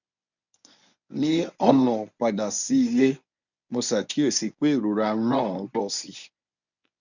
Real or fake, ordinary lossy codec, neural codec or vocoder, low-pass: fake; none; codec, 24 kHz, 0.9 kbps, WavTokenizer, medium speech release version 1; 7.2 kHz